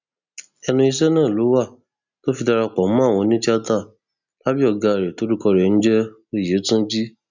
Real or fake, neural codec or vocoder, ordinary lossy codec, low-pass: real; none; none; 7.2 kHz